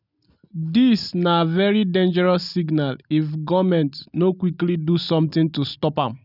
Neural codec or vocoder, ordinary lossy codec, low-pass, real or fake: none; none; 5.4 kHz; real